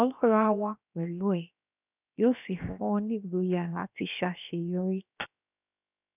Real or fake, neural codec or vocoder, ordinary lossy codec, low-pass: fake; codec, 16 kHz, 0.7 kbps, FocalCodec; none; 3.6 kHz